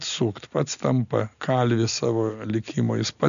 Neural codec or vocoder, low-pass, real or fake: none; 7.2 kHz; real